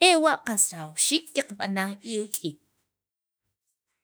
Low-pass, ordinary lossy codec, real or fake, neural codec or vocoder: none; none; fake; autoencoder, 48 kHz, 32 numbers a frame, DAC-VAE, trained on Japanese speech